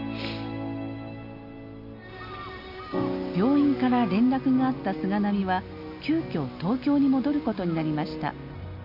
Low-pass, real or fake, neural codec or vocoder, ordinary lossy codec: 5.4 kHz; real; none; none